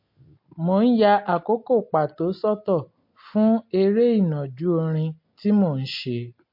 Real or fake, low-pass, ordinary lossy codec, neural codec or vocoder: real; 5.4 kHz; MP3, 32 kbps; none